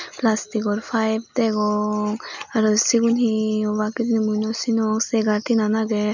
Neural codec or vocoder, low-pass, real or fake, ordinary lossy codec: none; 7.2 kHz; real; none